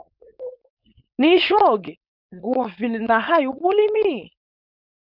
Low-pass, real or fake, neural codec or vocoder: 5.4 kHz; fake; codec, 16 kHz, 4.8 kbps, FACodec